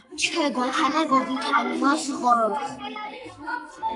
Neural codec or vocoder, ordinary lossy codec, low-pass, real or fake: codec, 44.1 kHz, 2.6 kbps, SNAC; AAC, 64 kbps; 10.8 kHz; fake